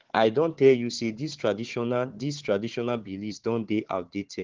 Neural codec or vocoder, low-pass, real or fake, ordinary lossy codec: codec, 16 kHz, 4 kbps, FunCodec, trained on Chinese and English, 50 frames a second; 7.2 kHz; fake; Opus, 16 kbps